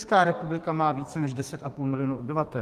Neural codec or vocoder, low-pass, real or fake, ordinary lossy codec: codec, 32 kHz, 1.9 kbps, SNAC; 14.4 kHz; fake; Opus, 24 kbps